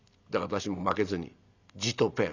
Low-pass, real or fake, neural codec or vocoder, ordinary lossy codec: 7.2 kHz; real; none; none